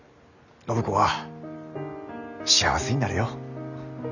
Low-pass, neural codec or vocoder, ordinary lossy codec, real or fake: 7.2 kHz; none; none; real